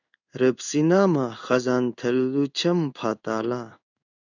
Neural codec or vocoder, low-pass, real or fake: codec, 16 kHz in and 24 kHz out, 1 kbps, XY-Tokenizer; 7.2 kHz; fake